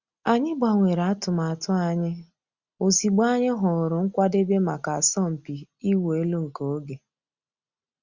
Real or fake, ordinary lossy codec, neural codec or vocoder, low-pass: real; Opus, 64 kbps; none; 7.2 kHz